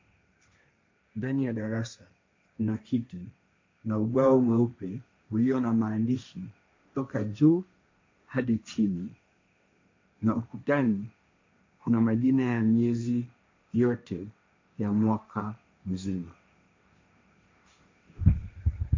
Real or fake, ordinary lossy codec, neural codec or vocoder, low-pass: fake; MP3, 64 kbps; codec, 16 kHz, 1.1 kbps, Voila-Tokenizer; 7.2 kHz